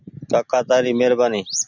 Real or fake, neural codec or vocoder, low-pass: real; none; 7.2 kHz